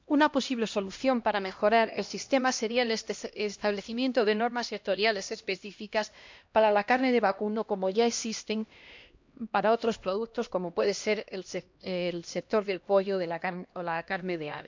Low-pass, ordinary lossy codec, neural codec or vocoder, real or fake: 7.2 kHz; MP3, 64 kbps; codec, 16 kHz, 1 kbps, X-Codec, HuBERT features, trained on LibriSpeech; fake